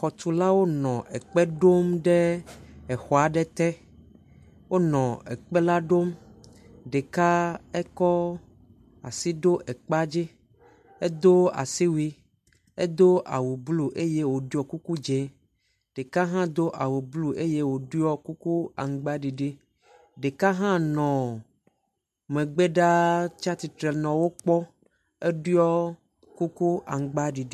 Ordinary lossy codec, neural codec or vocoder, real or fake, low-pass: MP3, 96 kbps; none; real; 14.4 kHz